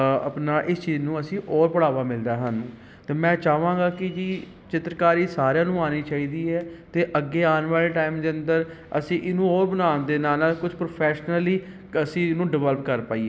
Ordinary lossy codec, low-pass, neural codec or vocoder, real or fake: none; none; none; real